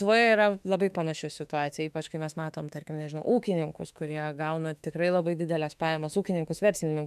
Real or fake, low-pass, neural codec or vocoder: fake; 14.4 kHz; autoencoder, 48 kHz, 32 numbers a frame, DAC-VAE, trained on Japanese speech